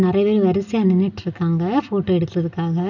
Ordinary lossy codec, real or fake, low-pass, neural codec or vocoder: none; real; 7.2 kHz; none